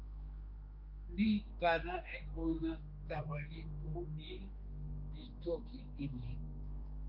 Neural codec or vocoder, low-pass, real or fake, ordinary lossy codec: codec, 16 kHz, 2 kbps, X-Codec, HuBERT features, trained on general audio; 5.4 kHz; fake; Opus, 24 kbps